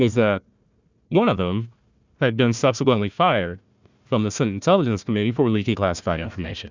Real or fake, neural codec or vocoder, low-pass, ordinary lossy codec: fake; codec, 16 kHz, 1 kbps, FunCodec, trained on Chinese and English, 50 frames a second; 7.2 kHz; Opus, 64 kbps